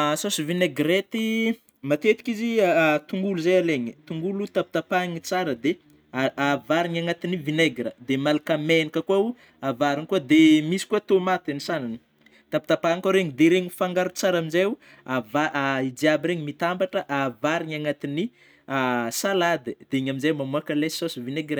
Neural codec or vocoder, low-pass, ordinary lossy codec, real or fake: none; none; none; real